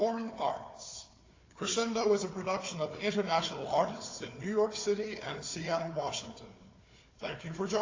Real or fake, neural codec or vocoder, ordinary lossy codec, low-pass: fake; codec, 16 kHz, 4 kbps, FunCodec, trained on Chinese and English, 50 frames a second; AAC, 32 kbps; 7.2 kHz